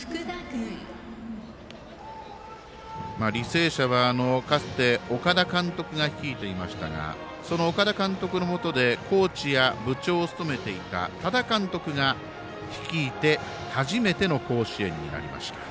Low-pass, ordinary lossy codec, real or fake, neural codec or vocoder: none; none; real; none